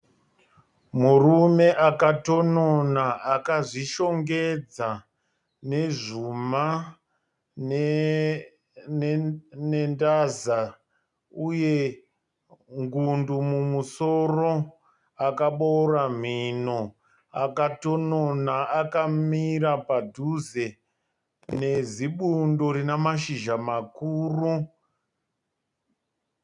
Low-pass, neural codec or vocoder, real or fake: 10.8 kHz; none; real